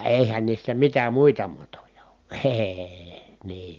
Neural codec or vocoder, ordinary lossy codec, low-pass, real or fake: none; Opus, 32 kbps; 7.2 kHz; real